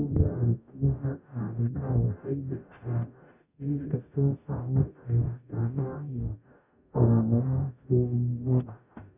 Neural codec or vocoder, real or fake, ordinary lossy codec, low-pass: codec, 44.1 kHz, 0.9 kbps, DAC; fake; none; 3.6 kHz